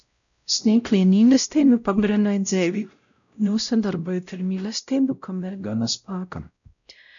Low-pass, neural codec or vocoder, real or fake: 7.2 kHz; codec, 16 kHz, 0.5 kbps, X-Codec, WavLM features, trained on Multilingual LibriSpeech; fake